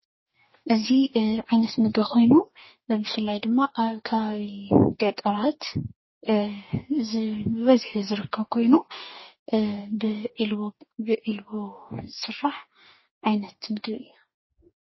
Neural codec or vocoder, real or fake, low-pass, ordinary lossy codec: codec, 44.1 kHz, 2.6 kbps, DAC; fake; 7.2 kHz; MP3, 24 kbps